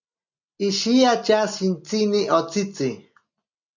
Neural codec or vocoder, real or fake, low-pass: none; real; 7.2 kHz